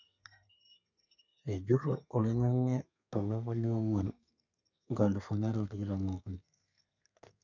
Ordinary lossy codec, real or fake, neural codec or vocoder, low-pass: none; fake; codec, 32 kHz, 1.9 kbps, SNAC; 7.2 kHz